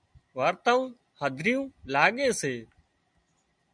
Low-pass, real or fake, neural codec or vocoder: 9.9 kHz; real; none